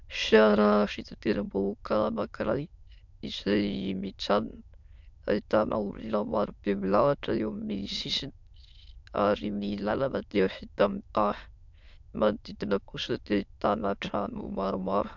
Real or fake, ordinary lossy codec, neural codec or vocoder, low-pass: fake; MP3, 64 kbps; autoencoder, 22.05 kHz, a latent of 192 numbers a frame, VITS, trained on many speakers; 7.2 kHz